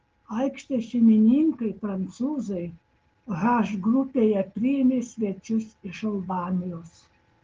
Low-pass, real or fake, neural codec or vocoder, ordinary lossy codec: 7.2 kHz; real; none; Opus, 16 kbps